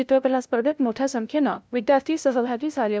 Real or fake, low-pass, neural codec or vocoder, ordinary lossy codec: fake; none; codec, 16 kHz, 0.5 kbps, FunCodec, trained on LibriTTS, 25 frames a second; none